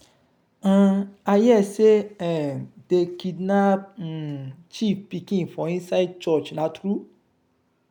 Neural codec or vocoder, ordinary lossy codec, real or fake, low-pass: none; none; real; 19.8 kHz